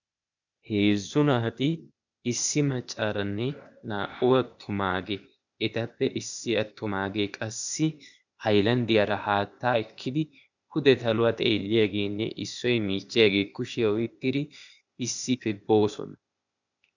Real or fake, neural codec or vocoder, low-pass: fake; codec, 16 kHz, 0.8 kbps, ZipCodec; 7.2 kHz